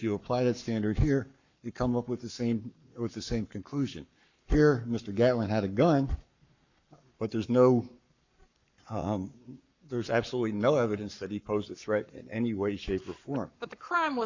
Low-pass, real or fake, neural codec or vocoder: 7.2 kHz; fake; codec, 44.1 kHz, 7.8 kbps, Pupu-Codec